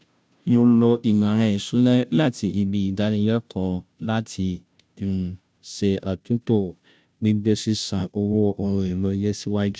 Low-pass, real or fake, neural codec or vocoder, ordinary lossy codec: none; fake; codec, 16 kHz, 0.5 kbps, FunCodec, trained on Chinese and English, 25 frames a second; none